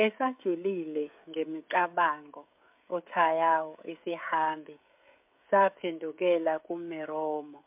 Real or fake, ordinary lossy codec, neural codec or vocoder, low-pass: fake; MP3, 32 kbps; codec, 16 kHz, 16 kbps, FreqCodec, smaller model; 3.6 kHz